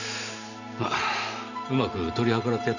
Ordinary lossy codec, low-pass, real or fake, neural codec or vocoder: none; 7.2 kHz; real; none